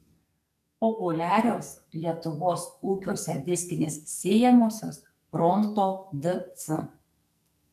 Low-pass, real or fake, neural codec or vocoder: 14.4 kHz; fake; codec, 44.1 kHz, 2.6 kbps, SNAC